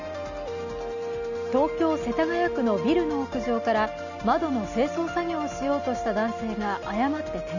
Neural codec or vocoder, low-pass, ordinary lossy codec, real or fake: none; 7.2 kHz; none; real